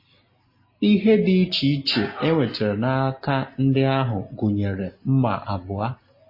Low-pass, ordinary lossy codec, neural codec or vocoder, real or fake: 5.4 kHz; MP3, 24 kbps; none; real